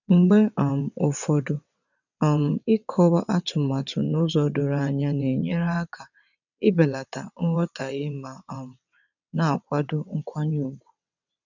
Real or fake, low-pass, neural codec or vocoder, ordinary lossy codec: fake; 7.2 kHz; vocoder, 22.05 kHz, 80 mel bands, WaveNeXt; none